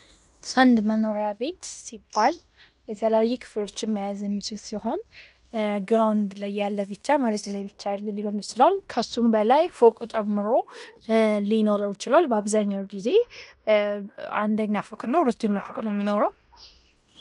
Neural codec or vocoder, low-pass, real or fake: codec, 16 kHz in and 24 kHz out, 0.9 kbps, LongCat-Audio-Codec, fine tuned four codebook decoder; 10.8 kHz; fake